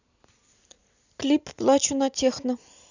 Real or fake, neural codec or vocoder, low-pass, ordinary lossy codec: real; none; 7.2 kHz; none